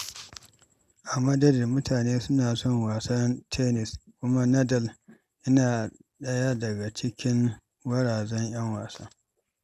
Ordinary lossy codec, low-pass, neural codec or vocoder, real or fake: none; 14.4 kHz; none; real